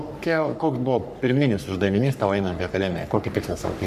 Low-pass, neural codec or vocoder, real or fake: 14.4 kHz; codec, 44.1 kHz, 3.4 kbps, Pupu-Codec; fake